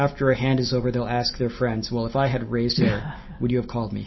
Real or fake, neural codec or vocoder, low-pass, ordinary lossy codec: real; none; 7.2 kHz; MP3, 24 kbps